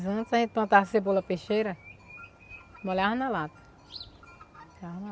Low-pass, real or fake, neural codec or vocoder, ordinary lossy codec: none; real; none; none